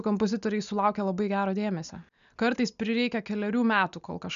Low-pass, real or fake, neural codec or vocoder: 7.2 kHz; real; none